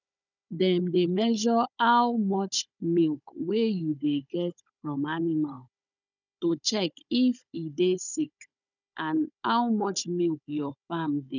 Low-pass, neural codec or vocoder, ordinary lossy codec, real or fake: 7.2 kHz; codec, 16 kHz, 16 kbps, FunCodec, trained on Chinese and English, 50 frames a second; none; fake